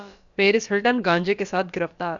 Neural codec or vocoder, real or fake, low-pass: codec, 16 kHz, about 1 kbps, DyCAST, with the encoder's durations; fake; 7.2 kHz